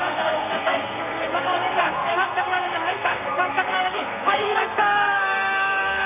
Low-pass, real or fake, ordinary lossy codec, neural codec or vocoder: 3.6 kHz; fake; MP3, 32 kbps; codec, 32 kHz, 1.9 kbps, SNAC